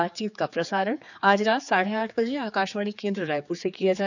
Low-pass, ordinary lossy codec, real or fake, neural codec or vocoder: 7.2 kHz; none; fake; codec, 16 kHz, 4 kbps, X-Codec, HuBERT features, trained on general audio